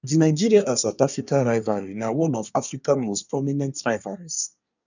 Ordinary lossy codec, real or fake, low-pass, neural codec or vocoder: none; fake; 7.2 kHz; codec, 24 kHz, 1 kbps, SNAC